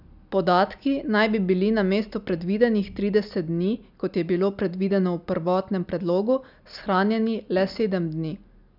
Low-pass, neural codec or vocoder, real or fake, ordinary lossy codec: 5.4 kHz; none; real; none